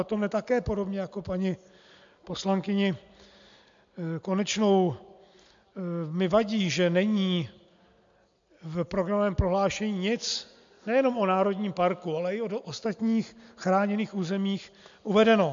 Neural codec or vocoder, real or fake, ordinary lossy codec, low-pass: none; real; MP3, 64 kbps; 7.2 kHz